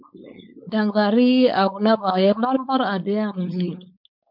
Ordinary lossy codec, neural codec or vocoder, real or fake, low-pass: MP3, 48 kbps; codec, 16 kHz, 4.8 kbps, FACodec; fake; 5.4 kHz